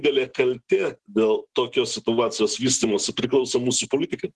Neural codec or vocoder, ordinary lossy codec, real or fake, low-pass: none; Opus, 16 kbps; real; 10.8 kHz